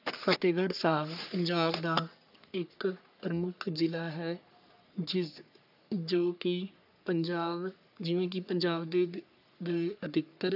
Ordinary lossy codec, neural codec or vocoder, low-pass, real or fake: none; codec, 44.1 kHz, 3.4 kbps, Pupu-Codec; 5.4 kHz; fake